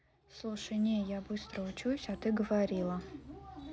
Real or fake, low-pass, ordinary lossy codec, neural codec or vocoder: real; none; none; none